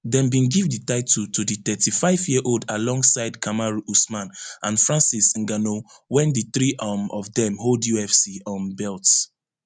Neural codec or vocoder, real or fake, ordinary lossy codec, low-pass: none; real; none; 9.9 kHz